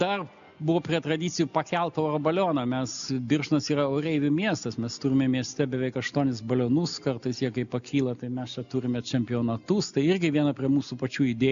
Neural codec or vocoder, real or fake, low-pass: none; real; 7.2 kHz